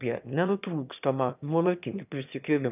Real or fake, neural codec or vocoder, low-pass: fake; autoencoder, 22.05 kHz, a latent of 192 numbers a frame, VITS, trained on one speaker; 3.6 kHz